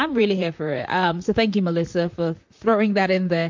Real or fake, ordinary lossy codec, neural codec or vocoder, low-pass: fake; MP3, 48 kbps; vocoder, 44.1 kHz, 128 mel bands, Pupu-Vocoder; 7.2 kHz